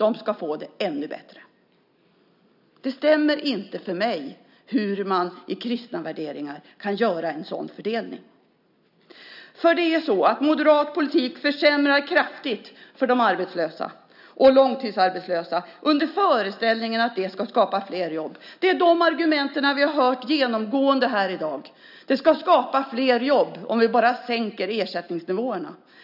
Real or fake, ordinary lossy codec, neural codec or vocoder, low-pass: real; none; none; 5.4 kHz